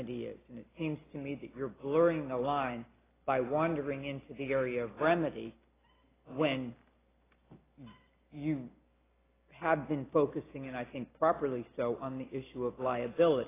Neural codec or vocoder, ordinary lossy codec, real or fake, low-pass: none; AAC, 16 kbps; real; 3.6 kHz